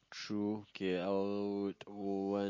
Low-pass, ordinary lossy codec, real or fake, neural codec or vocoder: 7.2 kHz; MP3, 32 kbps; real; none